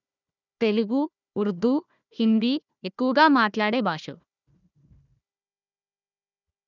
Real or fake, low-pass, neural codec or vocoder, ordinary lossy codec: fake; 7.2 kHz; codec, 16 kHz, 1 kbps, FunCodec, trained on Chinese and English, 50 frames a second; none